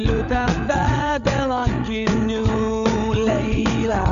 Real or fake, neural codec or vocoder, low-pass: fake; codec, 16 kHz, 8 kbps, FreqCodec, larger model; 7.2 kHz